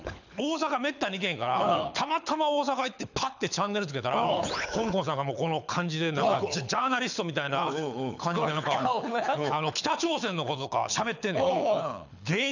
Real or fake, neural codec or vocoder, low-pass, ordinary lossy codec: fake; codec, 24 kHz, 6 kbps, HILCodec; 7.2 kHz; none